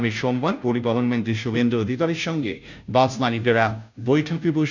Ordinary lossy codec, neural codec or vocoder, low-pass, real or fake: none; codec, 16 kHz, 0.5 kbps, FunCodec, trained on Chinese and English, 25 frames a second; 7.2 kHz; fake